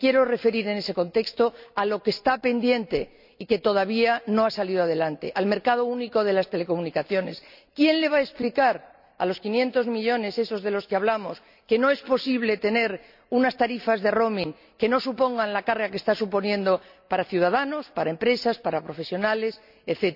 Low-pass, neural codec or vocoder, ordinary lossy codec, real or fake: 5.4 kHz; none; none; real